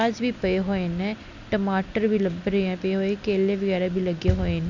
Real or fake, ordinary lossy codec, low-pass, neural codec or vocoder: real; none; 7.2 kHz; none